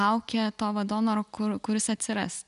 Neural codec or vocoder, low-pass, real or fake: none; 10.8 kHz; real